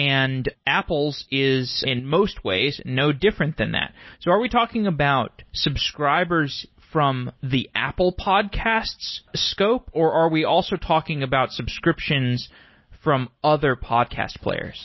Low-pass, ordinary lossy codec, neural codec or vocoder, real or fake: 7.2 kHz; MP3, 24 kbps; none; real